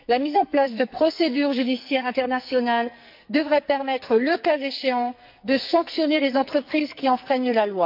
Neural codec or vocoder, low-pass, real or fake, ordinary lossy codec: codec, 44.1 kHz, 2.6 kbps, SNAC; 5.4 kHz; fake; MP3, 48 kbps